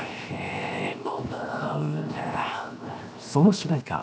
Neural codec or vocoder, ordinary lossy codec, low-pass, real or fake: codec, 16 kHz, 0.7 kbps, FocalCodec; none; none; fake